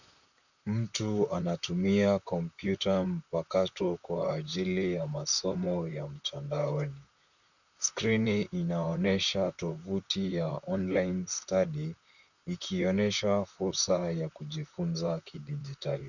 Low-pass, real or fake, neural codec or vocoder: 7.2 kHz; fake; vocoder, 44.1 kHz, 128 mel bands, Pupu-Vocoder